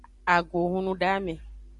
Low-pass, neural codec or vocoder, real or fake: 10.8 kHz; vocoder, 44.1 kHz, 128 mel bands every 512 samples, BigVGAN v2; fake